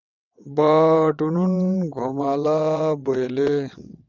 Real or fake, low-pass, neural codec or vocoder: fake; 7.2 kHz; vocoder, 22.05 kHz, 80 mel bands, WaveNeXt